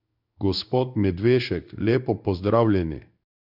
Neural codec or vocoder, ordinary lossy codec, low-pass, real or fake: codec, 16 kHz in and 24 kHz out, 1 kbps, XY-Tokenizer; none; 5.4 kHz; fake